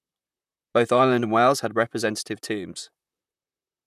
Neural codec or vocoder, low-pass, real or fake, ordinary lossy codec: vocoder, 44.1 kHz, 128 mel bands, Pupu-Vocoder; 14.4 kHz; fake; none